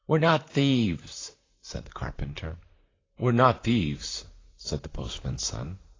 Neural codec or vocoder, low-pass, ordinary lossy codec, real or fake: vocoder, 44.1 kHz, 128 mel bands, Pupu-Vocoder; 7.2 kHz; AAC, 32 kbps; fake